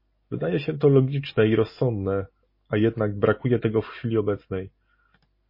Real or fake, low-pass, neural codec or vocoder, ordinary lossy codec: real; 5.4 kHz; none; MP3, 24 kbps